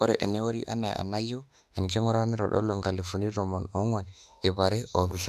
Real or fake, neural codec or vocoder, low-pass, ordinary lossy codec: fake; autoencoder, 48 kHz, 32 numbers a frame, DAC-VAE, trained on Japanese speech; 14.4 kHz; none